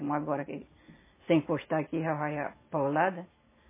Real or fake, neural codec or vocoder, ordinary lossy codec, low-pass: real; none; MP3, 16 kbps; 3.6 kHz